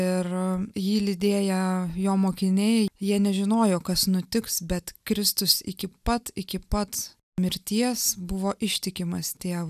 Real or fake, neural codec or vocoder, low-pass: real; none; 14.4 kHz